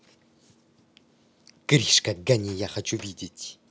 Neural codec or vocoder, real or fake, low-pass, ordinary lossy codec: none; real; none; none